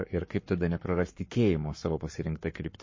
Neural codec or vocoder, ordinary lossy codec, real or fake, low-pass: codec, 44.1 kHz, 7.8 kbps, Pupu-Codec; MP3, 32 kbps; fake; 7.2 kHz